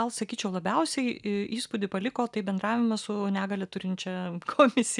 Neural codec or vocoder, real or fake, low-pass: none; real; 10.8 kHz